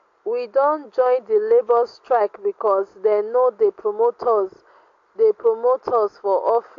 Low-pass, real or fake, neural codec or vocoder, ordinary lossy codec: 7.2 kHz; real; none; AAC, 48 kbps